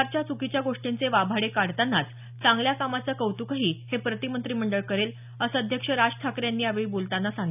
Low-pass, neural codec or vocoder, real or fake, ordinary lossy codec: 3.6 kHz; none; real; none